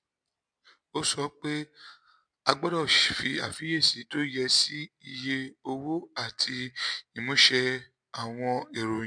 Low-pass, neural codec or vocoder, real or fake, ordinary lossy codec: 9.9 kHz; none; real; MP3, 64 kbps